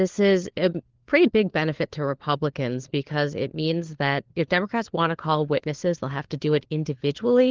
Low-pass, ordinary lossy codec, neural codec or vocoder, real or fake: 7.2 kHz; Opus, 16 kbps; codec, 16 kHz, 4 kbps, FunCodec, trained on LibriTTS, 50 frames a second; fake